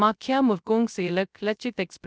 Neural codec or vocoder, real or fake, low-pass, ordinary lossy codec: codec, 16 kHz, 0.3 kbps, FocalCodec; fake; none; none